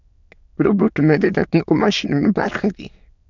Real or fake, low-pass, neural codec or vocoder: fake; 7.2 kHz; autoencoder, 22.05 kHz, a latent of 192 numbers a frame, VITS, trained on many speakers